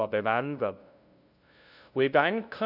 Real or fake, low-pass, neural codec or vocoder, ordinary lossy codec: fake; 5.4 kHz; codec, 16 kHz, 0.5 kbps, FunCodec, trained on LibriTTS, 25 frames a second; none